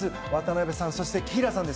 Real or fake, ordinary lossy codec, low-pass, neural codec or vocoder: real; none; none; none